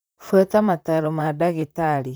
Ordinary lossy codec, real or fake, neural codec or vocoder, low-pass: none; fake; vocoder, 44.1 kHz, 128 mel bands, Pupu-Vocoder; none